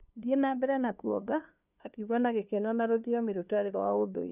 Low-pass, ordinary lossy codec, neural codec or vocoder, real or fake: 3.6 kHz; none; codec, 16 kHz, 2 kbps, FunCodec, trained on LibriTTS, 25 frames a second; fake